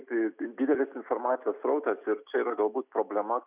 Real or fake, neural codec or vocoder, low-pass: real; none; 3.6 kHz